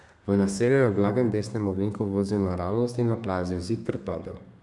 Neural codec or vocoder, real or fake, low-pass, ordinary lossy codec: codec, 32 kHz, 1.9 kbps, SNAC; fake; 10.8 kHz; none